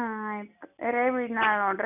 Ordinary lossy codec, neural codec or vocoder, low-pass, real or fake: none; none; 3.6 kHz; real